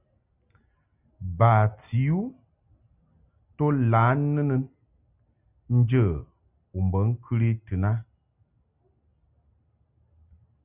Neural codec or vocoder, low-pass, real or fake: none; 3.6 kHz; real